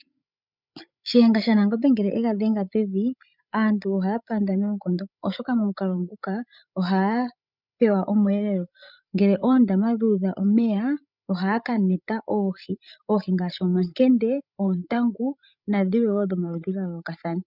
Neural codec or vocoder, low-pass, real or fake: codec, 16 kHz, 16 kbps, FreqCodec, larger model; 5.4 kHz; fake